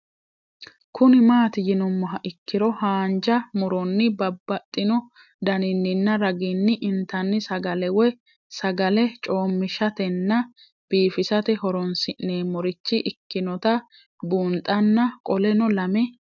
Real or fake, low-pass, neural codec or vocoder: real; 7.2 kHz; none